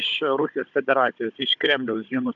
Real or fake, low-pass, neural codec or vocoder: fake; 7.2 kHz; codec, 16 kHz, 16 kbps, FunCodec, trained on Chinese and English, 50 frames a second